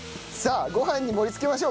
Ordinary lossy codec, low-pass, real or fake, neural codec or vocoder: none; none; real; none